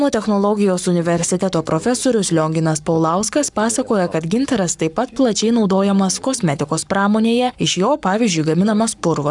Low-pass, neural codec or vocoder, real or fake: 10.8 kHz; codec, 44.1 kHz, 7.8 kbps, Pupu-Codec; fake